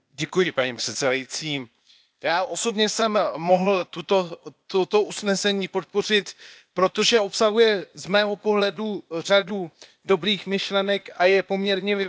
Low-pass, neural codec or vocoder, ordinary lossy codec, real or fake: none; codec, 16 kHz, 0.8 kbps, ZipCodec; none; fake